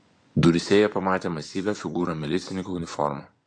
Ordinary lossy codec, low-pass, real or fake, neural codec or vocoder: AAC, 64 kbps; 9.9 kHz; fake; vocoder, 24 kHz, 100 mel bands, Vocos